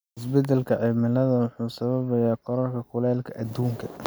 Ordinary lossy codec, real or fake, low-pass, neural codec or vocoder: none; real; none; none